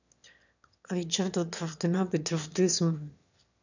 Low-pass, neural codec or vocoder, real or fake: 7.2 kHz; autoencoder, 22.05 kHz, a latent of 192 numbers a frame, VITS, trained on one speaker; fake